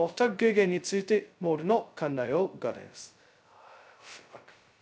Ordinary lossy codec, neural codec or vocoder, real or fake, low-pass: none; codec, 16 kHz, 0.2 kbps, FocalCodec; fake; none